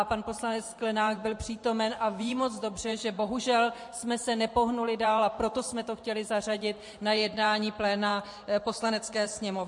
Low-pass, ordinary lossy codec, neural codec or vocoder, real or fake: 10.8 kHz; MP3, 48 kbps; vocoder, 44.1 kHz, 128 mel bands every 512 samples, BigVGAN v2; fake